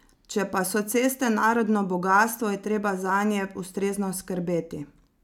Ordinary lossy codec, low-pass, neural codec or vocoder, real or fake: none; 19.8 kHz; none; real